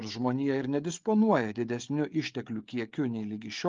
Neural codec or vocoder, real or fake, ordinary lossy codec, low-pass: codec, 16 kHz, 16 kbps, FreqCodec, smaller model; fake; Opus, 32 kbps; 7.2 kHz